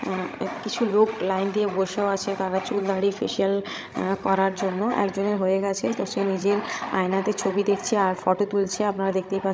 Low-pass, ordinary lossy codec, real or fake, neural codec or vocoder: none; none; fake; codec, 16 kHz, 16 kbps, FreqCodec, larger model